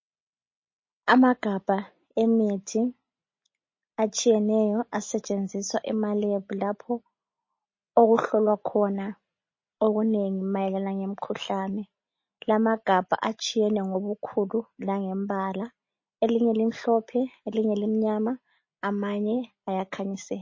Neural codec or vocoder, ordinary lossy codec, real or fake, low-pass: none; MP3, 32 kbps; real; 7.2 kHz